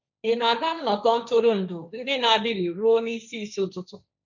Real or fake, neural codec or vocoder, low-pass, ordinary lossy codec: fake; codec, 16 kHz, 1.1 kbps, Voila-Tokenizer; 7.2 kHz; none